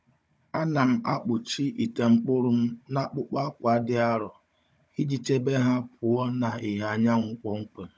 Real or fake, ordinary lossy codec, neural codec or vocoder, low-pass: fake; none; codec, 16 kHz, 16 kbps, FunCodec, trained on Chinese and English, 50 frames a second; none